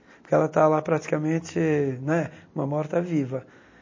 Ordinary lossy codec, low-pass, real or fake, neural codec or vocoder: MP3, 32 kbps; 7.2 kHz; real; none